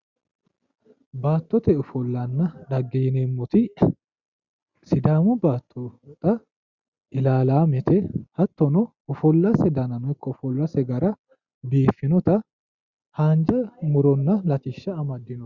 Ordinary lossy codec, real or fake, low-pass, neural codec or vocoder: Opus, 64 kbps; real; 7.2 kHz; none